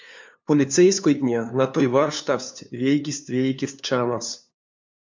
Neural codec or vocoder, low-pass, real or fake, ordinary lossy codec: codec, 16 kHz, 2 kbps, FunCodec, trained on LibriTTS, 25 frames a second; 7.2 kHz; fake; MP3, 64 kbps